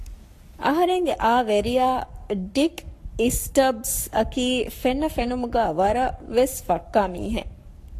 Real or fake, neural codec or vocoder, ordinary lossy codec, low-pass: fake; codec, 44.1 kHz, 7.8 kbps, Pupu-Codec; AAC, 64 kbps; 14.4 kHz